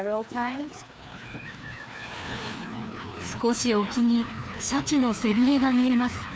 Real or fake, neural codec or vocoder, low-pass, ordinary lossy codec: fake; codec, 16 kHz, 2 kbps, FreqCodec, larger model; none; none